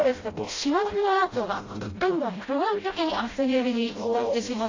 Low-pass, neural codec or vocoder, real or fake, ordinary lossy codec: 7.2 kHz; codec, 16 kHz, 0.5 kbps, FreqCodec, smaller model; fake; AAC, 32 kbps